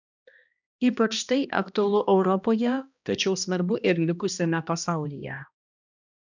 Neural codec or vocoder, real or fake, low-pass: codec, 16 kHz, 1 kbps, X-Codec, HuBERT features, trained on balanced general audio; fake; 7.2 kHz